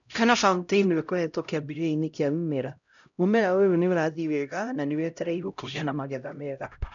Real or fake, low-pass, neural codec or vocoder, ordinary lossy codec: fake; 7.2 kHz; codec, 16 kHz, 0.5 kbps, X-Codec, HuBERT features, trained on LibriSpeech; none